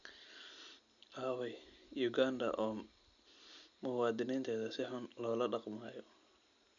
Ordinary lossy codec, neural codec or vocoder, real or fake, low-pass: none; none; real; 7.2 kHz